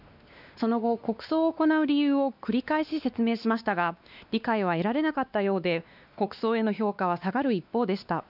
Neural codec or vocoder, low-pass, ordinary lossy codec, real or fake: codec, 16 kHz, 2 kbps, X-Codec, WavLM features, trained on Multilingual LibriSpeech; 5.4 kHz; none; fake